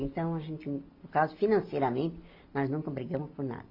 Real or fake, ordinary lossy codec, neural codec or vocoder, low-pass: real; AAC, 32 kbps; none; 5.4 kHz